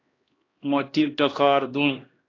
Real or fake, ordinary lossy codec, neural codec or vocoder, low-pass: fake; AAC, 32 kbps; codec, 16 kHz, 1 kbps, X-Codec, HuBERT features, trained on LibriSpeech; 7.2 kHz